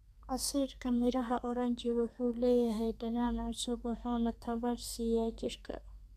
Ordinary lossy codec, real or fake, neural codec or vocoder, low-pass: none; fake; codec, 32 kHz, 1.9 kbps, SNAC; 14.4 kHz